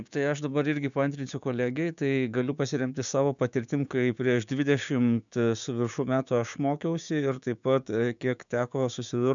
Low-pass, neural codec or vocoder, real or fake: 7.2 kHz; codec, 16 kHz, 6 kbps, DAC; fake